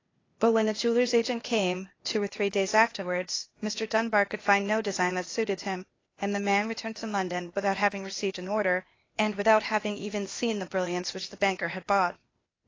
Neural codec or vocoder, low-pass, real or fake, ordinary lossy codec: codec, 16 kHz, 0.8 kbps, ZipCodec; 7.2 kHz; fake; AAC, 32 kbps